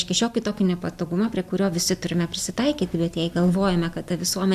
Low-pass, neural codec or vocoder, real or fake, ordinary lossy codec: 14.4 kHz; none; real; AAC, 96 kbps